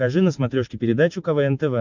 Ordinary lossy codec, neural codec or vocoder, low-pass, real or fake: MP3, 64 kbps; none; 7.2 kHz; real